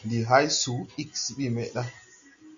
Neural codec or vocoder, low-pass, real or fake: none; 7.2 kHz; real